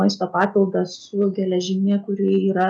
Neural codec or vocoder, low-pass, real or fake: none; 9.9 kHz; real